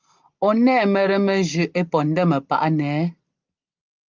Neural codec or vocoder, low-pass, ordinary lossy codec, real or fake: none; 7.2 kHz; Opus, 24 kbps; real